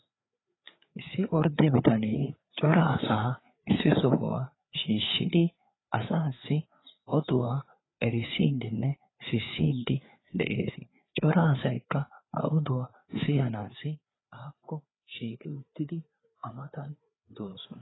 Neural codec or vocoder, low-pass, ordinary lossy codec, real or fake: codec, 16 kHz, 4 kbps, FreqCodec, larger model; 7.2 kHz; AAC, 16 kbps; fake